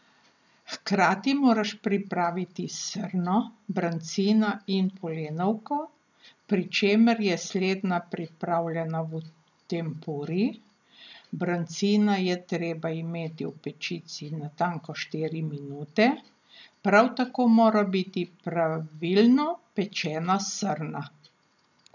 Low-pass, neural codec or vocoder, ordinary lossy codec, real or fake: 7.2 kHz; none; none; real